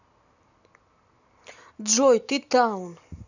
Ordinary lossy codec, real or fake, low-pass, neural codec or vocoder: none; real; 7.2 kHz; none